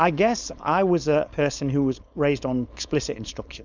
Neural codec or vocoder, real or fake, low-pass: codec, 16 kHz, 4.8 kbps, FACodec; fake; 7.2 kHz